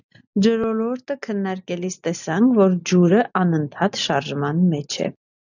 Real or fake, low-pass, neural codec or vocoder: real; 7.2 kHz; none